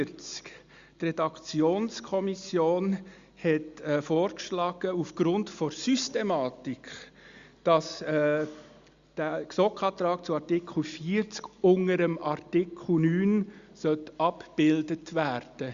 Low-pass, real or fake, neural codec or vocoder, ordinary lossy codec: 7.2 kHz; real; none; Opus, 64 kbps